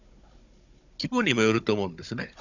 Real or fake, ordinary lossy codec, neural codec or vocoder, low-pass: fake; none; codec, 16 kHz, 16 kbps, FunCodec, trained on Chinese and English, 50 frames a second; 7.2 kHz